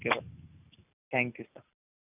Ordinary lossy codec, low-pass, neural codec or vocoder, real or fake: none; 3.6 kHz; none; real